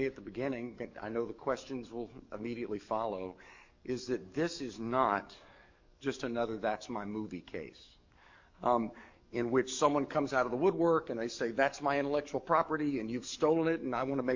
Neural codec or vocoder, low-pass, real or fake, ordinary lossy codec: codec, 44.1 kHz, 7.8 kbps, DAC; 7.2 kHz; fake; MP3, 48 kbps